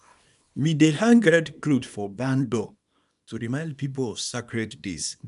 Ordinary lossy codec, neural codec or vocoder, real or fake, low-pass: none; codec, 24 kHz, 0.9 kbps, WavTokenizer, small release; fake; 10.8 kHz